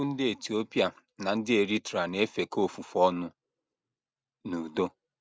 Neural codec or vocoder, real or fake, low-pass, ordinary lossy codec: none; real; none; none